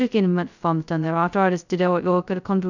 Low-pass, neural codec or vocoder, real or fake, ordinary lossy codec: 7.2 kHz; codec, 16 kHz, 0.2 kbps, FocalCodec; fake; none